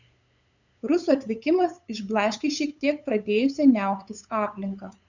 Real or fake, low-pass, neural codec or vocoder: fake; 7.2 kHz; codec, 16 kHz, 8 kbps, FunCodec, trained on LibriTTS, 25 frames a second